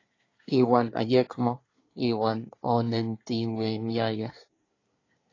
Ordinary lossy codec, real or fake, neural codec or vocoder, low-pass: AAC, 32 kbps; fake; codec, 24 kHz, 1 kbps, SNAC; 7.2 kHz